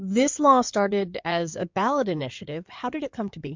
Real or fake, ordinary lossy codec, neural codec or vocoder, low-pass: fake; MP3, 64 kbps; codec, 16 kHz in and 24 kHz out, 2.2 kbps, FireRedTTS-2 codec; 7.2 kHz